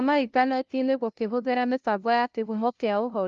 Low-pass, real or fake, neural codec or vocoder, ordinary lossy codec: 7.2 kHz; fake; codec, 16 kHz, 0.5 kbps, FunCodec, trained on LibriTTS, 25 frames a second; Opus, 24 kbps